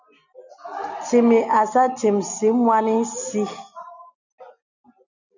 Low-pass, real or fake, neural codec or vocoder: 7.2 kHz; real; none